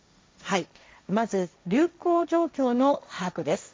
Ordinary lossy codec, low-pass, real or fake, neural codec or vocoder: none; none; fake; codec, 16 kHz, 1.1 kbps, Voila-Tokenizer